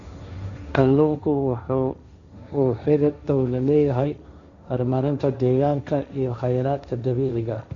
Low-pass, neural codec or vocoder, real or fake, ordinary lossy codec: 7.2 kHz; codec, 16 kHz, 1.1 kbps, Voila-Tokenizer; fake; none